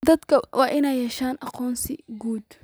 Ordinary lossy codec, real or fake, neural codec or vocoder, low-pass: none; real; none; none